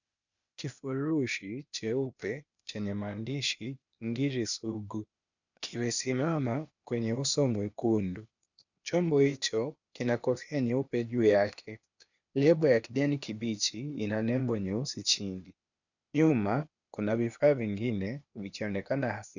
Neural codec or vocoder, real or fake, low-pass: codec, 16 kHz, 0.8 kbps, ZipCodec; fake; 7.2 kHz